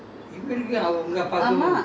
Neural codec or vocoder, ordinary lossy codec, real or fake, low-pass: none; none; real; none